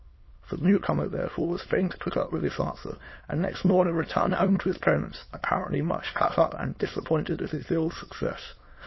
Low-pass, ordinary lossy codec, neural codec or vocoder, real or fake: 7.2 kHz; MP3, 24 kbps; autoencoder, 22.05 kHz, a latent of 192 numbers a frame, VITS, trained on many speakers; fake